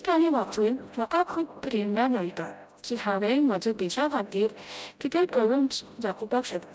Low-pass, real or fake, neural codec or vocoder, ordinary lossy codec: none; fake; codec, 16 kHz, 0.5 kbps, FreqCodec, smaller model; none